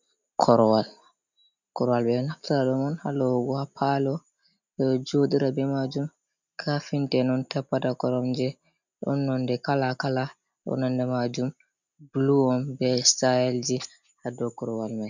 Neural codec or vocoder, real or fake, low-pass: none; real; 7.2 kHz